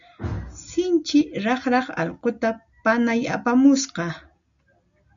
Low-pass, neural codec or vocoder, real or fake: 7.2 kHz; none; real